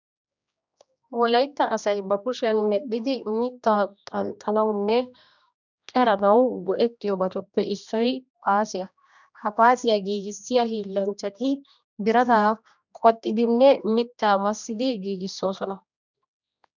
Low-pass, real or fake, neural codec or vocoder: 7.2 kHz; fake; codec, 16 kHz, 1 kbps, X-Codec, HuBERT features, trained on general audio